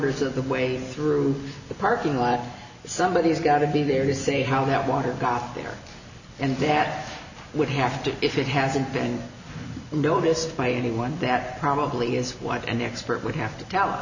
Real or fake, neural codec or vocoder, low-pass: real; none; 7.2 kHz